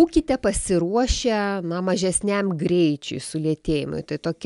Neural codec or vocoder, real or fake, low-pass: none; real; 10.8 kHz